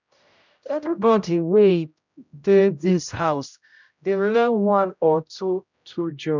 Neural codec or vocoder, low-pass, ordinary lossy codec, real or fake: codec, 16 kHz, 0.5 kbps, X-Codec, HuBERT features, trained on general audio; 7.2 kHz; none; fake